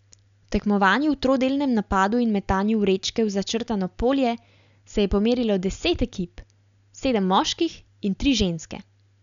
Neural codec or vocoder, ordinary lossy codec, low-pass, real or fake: none; none; 7.2 kHz; real